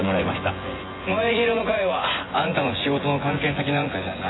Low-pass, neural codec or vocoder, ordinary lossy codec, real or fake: 7.2 kHz; vocoder, 24 kHz, 100 mel bands, Vocos; AAC, 16 kbps; fake